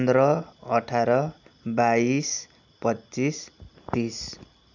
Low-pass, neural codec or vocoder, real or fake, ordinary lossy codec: 7.2 kHz; none; real; none